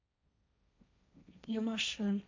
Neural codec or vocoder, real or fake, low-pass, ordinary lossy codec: codec, 16 kHz, 1.1 kbps, Voila-Tokenizer; fake; 7.2 kHz; MP3, 64 kbps